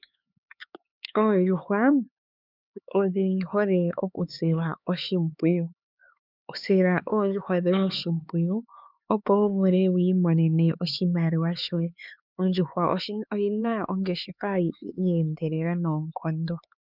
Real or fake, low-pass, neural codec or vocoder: fake; 5.4 kHz; codec, 16 kHz, 4 kbps, X-Codec, HuBERT features, trained on LibriSpeech